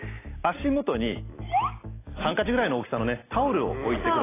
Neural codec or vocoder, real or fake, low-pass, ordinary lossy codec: none; real; 3.6 kHz; AAC, 16 kbps